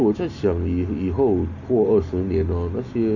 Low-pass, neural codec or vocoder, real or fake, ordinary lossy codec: 7.2 kHz; none; real; none